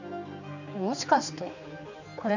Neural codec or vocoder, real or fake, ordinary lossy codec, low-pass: codec, 16 kHz, 4 kbps, X-Codec, HuBERT features, trained on general audio; fake; none; 7.2 kHz